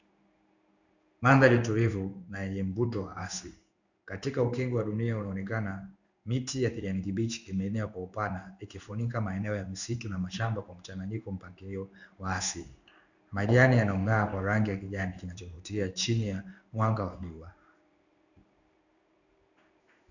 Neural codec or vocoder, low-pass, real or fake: codec, 16 kHz in and 24 kHz out, 1 kbps, XY-Tokenizer; 7.2 kHz; fake